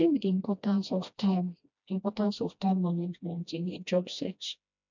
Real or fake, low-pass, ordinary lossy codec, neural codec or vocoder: fake; 7.2 kHz; none; codec, 16 kHz, 1 kbps, FreqCodec, smaller model